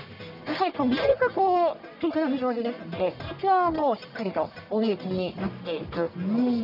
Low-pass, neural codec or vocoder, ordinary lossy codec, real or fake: 5.4 kHz; codec, 44.1 kHz, 1.7 kbps, Pupu-Codec; none; fake